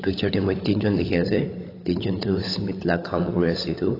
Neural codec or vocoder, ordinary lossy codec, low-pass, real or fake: codec, 16 kHz, 16 kbps, FunCodec, trained on LibriTTS, 50 frames a second; AAC, 24 kbps; 5.4 kHz; fake